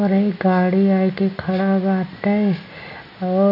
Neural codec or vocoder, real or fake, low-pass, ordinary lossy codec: none; real; 5.4 kHz; none